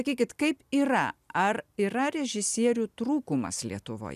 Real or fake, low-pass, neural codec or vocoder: real; 14.4 kHz; none